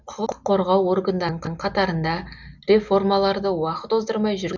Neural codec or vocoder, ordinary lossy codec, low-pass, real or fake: none; none; 7.2 kHz; real